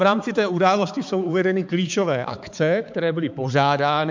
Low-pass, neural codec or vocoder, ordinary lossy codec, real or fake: 7.2 kHz; codec, 16 kHz, 4 kbps, X-Codec, HuBERT features, trained on balanced general audio; MP3, 64 kbps; fake